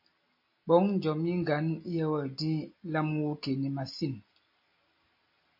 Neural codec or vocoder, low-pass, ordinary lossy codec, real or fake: none; 5.4 kHz; MP3, 32 kbps; real